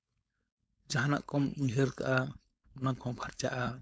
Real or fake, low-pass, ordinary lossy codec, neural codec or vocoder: fake; none; none; codec, 16 kHz, 4.8 kbps, FACodec